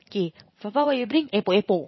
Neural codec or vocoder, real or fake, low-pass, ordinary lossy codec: vocoder, 44.1 kHz, 80 mel bands, Vocos; fake; 7.2 kHz; MP3, 24 kbps